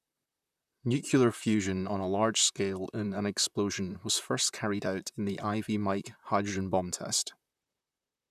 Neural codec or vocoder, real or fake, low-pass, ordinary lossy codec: vocoder, 44.1 kHz, 128 mel bands, Pupu-Vocoder; fake; 14.4 kHz; none